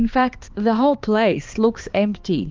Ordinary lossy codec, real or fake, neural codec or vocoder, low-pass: Opus, 32 kbps; fake; codec, 16 kHz, 2 kbps, X-Codec, HuBERT features, trained on balanced general audio; 7.2 kHz